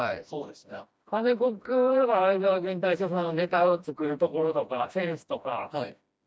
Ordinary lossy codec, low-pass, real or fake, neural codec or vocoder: none; none; fake; codec, 16 kHz, 1 kbps, FreqCodec, smaller model